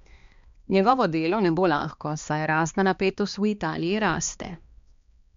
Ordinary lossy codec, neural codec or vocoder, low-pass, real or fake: MP3, 64 kbps; codec, 16 kHz, 2 kbps, X-Codec, HuBERT features, trained on balanced general audio; 7.2 kHz; fake